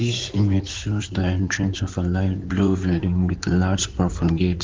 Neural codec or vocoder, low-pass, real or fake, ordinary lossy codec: codec, 16 kHz in and 24 kHz out, 2.2 kbps, FireRedTTS-2 codec; 7.2 kHz; fake; Opus, 16 kbps